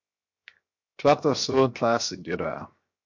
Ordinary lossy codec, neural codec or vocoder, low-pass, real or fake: MP3, 48 kbps; codec, 16 kHz, 0.7 kbps, FocalCodec; 7.2 kHz; fake